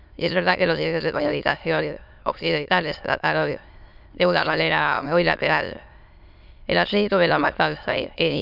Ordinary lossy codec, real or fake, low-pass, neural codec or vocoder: none; fake; 5.4 kHz; autoencoder, 22.05 kHz, a latent of 192 numbers a frame, VITS, trained on many speakers